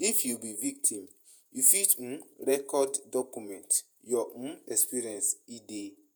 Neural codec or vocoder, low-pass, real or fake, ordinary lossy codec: vocoder, 48 kHz, 128 mel bands, Vocos; none; fake; none